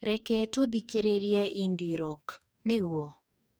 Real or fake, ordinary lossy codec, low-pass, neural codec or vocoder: fake; none; none; codec, 44.1 kHz, 2.6 kbps, DAC